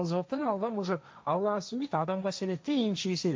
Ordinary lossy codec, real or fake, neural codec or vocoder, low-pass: none; fake; codec, 16 kHz, 1.1 kbps, Voila-Tokenizer; none